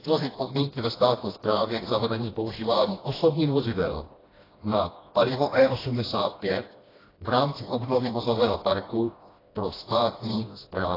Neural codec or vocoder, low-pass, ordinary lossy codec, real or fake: codec, 16 kHz, 1 kbps, FreqCodec, smaller model; 5.4 kHz; AAC, 24 kbps; fake